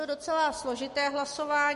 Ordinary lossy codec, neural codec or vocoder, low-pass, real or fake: MP3, 48 kbps; none; 14.4 kHz; real